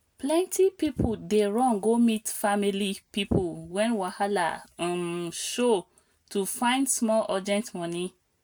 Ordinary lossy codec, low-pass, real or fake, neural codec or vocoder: none; none; real; none